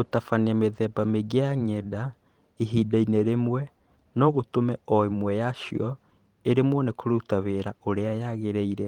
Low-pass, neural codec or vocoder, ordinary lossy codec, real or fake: 19.8 kHz; vocoder, 44.1 kHz, 128 mel bands every 512 samples, BigVGAN v2; Opus, 24 kbps; fake